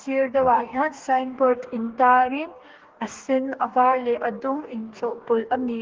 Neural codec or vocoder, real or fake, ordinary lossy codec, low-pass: codec, 44.1 kHz, 2.6 kbps, DAC; fake; Opus, 16 kbps; 7.2 kHz